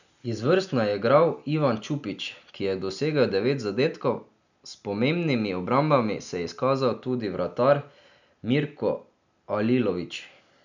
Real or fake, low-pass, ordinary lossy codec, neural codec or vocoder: real; 7.2 kHz; none; none